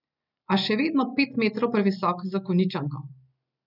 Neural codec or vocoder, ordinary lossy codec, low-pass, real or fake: none; none; 5.4 kHz; real